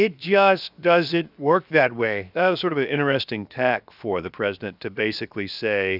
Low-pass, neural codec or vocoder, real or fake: 5.4 kHz; codec, 16 kHz, 0.7 kbps, FocalCodec; fake